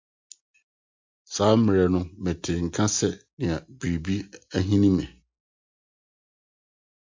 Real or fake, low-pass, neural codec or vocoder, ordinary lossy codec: real; 7.2 kHz; none; MP3, 48 kbps